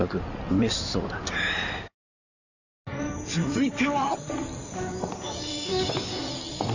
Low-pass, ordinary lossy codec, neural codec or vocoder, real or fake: 7.2 kHz; none; codec, 16 kHz in and 24 kHz out, 2.2 kbps, FireRedTTS-2 codec; fake